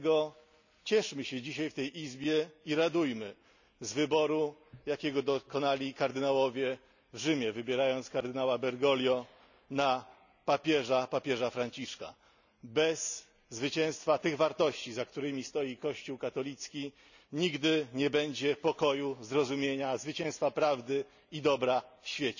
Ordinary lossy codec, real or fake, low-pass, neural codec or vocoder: none; real; 7.2 kHz; none